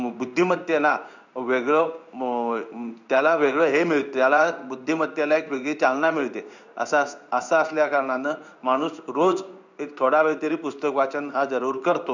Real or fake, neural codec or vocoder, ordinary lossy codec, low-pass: fake; codec, 16 kHz in and 24 kHz out, 1 kbps, XY-Tokenizer; none; 7.2 kHz